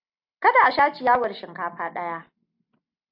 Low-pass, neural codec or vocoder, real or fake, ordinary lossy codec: 5.4 kHz; none; real; AAC, 48 kbps